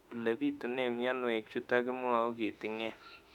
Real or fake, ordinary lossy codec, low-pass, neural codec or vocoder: fake; none; 19.8 kHz; autoencoder, 48 kHz, 32 numbers a frame, DAC-VAE, trained on Japanese speech